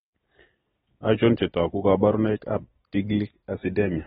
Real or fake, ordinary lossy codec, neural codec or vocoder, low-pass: real; AAC, 16 kbps; none; 19.8 kHz